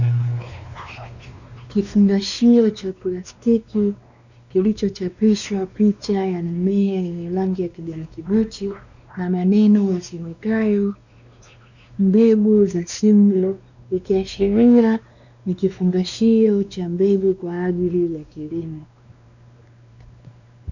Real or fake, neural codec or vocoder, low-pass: fake; codec, 16 kHz, 2 kbps, X-Codec, WavLM features, trained on Multilingual LibriSpeech; 7.2 kHz